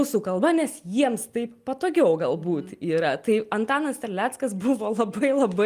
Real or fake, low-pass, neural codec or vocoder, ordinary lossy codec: real; 14.4 kHz; none; Opus, 32 kbps